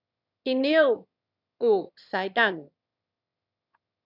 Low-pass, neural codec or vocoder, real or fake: 5.4 kHz; autoencoder, 22.05 kHz, a latent of 192 numbers a frame, VITS, trained on one speaker; fake